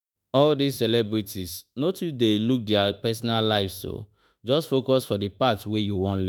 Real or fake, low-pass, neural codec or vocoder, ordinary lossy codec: fake; none; autoencoder, 48 kHz, 32 numbers a frame, DAC-VAE, trained on Japanese speech; none